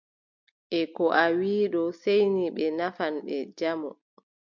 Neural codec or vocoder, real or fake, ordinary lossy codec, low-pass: none; real; MP3, 64 kbps; 7.2 kHz